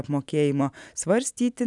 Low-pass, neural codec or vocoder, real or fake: 10.8 kHz; none; real